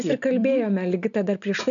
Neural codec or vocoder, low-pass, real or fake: none; 7.2 kHz; real